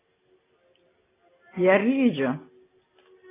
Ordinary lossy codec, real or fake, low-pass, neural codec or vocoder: AAC, 16 kbps; real; 3.6 kHz; none